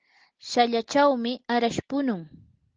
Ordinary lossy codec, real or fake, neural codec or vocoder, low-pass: Opus, 16 kbps; real; none; 7.2 kHz